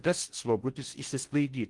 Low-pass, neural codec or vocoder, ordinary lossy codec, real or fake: 10.8 kHz; codec, 16 kHz in and 24 kHz out, 0.6 kbps, FocalCodec, streaming, 4096 codes; Opus, 24 kbps; fake